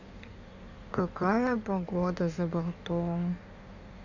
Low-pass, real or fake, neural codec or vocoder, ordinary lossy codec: 7.2 kHz; fake; codec, 16 kHz in and 24 kHz out, 1.1 kbps, FireRedTTS-2 codec; none